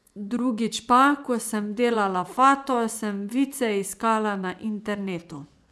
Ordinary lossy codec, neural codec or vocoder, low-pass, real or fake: none; vocoder, 24 kHz, 100 mel bands, Vocos; none; fake